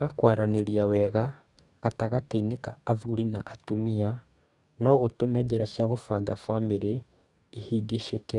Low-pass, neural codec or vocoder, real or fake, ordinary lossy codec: 10.8 kHz; codec, 44.1 kHz, 2.6 kbps, DAC; fake; none